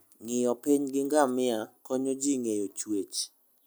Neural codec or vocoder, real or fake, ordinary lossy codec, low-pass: none; real; none; none